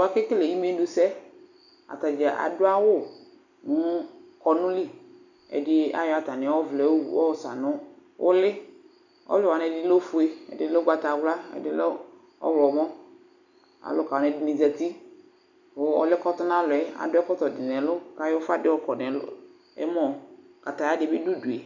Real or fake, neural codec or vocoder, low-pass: real; none; 7.2 kHz